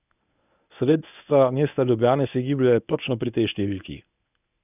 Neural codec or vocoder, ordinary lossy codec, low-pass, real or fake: codec, 24 kHz, 0.9 kbps, WavTokenizer, medium speech release version 1; none; 3.6 kHz; fake